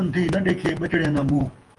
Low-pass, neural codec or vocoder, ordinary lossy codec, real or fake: 10.8 kHz; vocoder, 48 kHz, 128 mel bands, Vocos; Opus, 24 kbps; fake